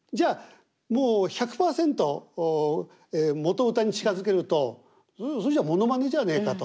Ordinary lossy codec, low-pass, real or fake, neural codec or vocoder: none; none; real; none